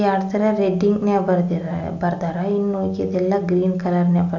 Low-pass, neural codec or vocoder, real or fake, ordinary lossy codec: 7.2 kHz; none; real; AAC, 48 kbps